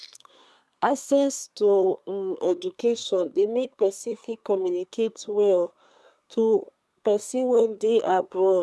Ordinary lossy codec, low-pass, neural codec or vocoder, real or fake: none; none; codec, 24 kHz, 1 kbps, SNAC; fake